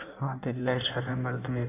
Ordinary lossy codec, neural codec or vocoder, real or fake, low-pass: AAC, 24 kbps; codec, 16 kHz in and 24 kHz out, 1.1 kbps, FireRedTTS-2 codec; fake; 3.6 kHz